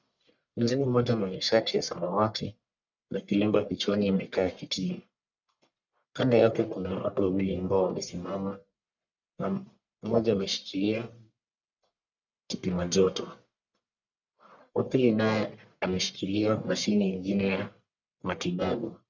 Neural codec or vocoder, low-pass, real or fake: codec, 44.1 kHz, 1.7 kbps, Pupu-Codec; 7.2 kHz; fake